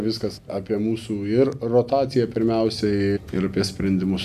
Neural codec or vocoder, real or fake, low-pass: none; real; 14.4 kHz